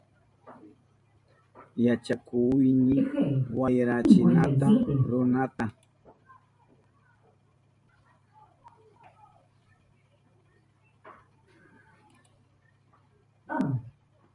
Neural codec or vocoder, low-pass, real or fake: vocoder, 44.1 kHz, 128 mel bands every 512 samples, BigVGAN v2; 10.8 kHz; fake